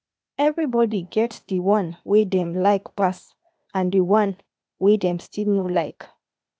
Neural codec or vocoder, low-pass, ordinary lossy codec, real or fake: codec, 16 kHz, 0.8 kbps, ZipCodec; none; none; fake